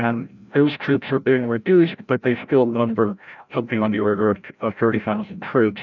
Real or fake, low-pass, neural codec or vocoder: fake; 7.2 kHz; codec, 16 kHz, 0.5 kbps, FreqCodec, larger model